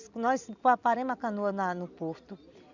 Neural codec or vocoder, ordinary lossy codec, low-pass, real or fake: none; none; 7.2 kHz; real